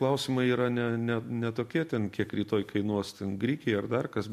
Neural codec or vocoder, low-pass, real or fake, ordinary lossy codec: vocoder, 44.1 kHz, 128 mel bands every 256 samples, BigVGAN v2; 14.4 kHz; fake; MP3, 64 kbps